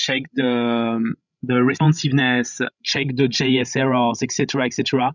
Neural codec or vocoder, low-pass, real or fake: codec, 16 kHz, 16 kbps, FreqCodec, larger model; 7.2 kHz; fake